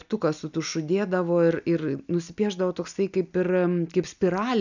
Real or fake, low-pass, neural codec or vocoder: real; 7.2 kHz; none